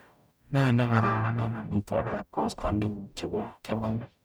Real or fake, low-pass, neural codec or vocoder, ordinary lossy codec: fake; none; codec, 44.1 kHz, 0.9 kbps, DAC; none